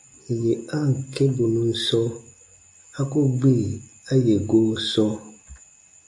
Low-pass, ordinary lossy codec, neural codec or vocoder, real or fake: 10.8 kHz; AAC, 64 kbps; none; real